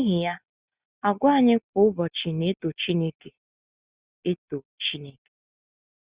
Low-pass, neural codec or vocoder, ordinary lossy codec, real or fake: 3.6 kHz; none; Opus, 64 kbps; real